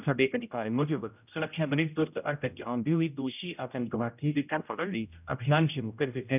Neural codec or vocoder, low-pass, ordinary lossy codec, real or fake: codec, 16 kHz, 0.5 kbps, X-Codec, HuBERT features, trained on general audio; 3.6 kHz; none; fake